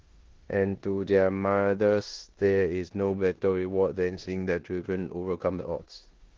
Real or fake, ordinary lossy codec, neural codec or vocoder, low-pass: fake; Opus, 16 kbps; codec, 16 kHz in and 24 kHz out, 0.9 kbps, LongCat-Audio-Codec, four codebook decoder; 7.2 kHz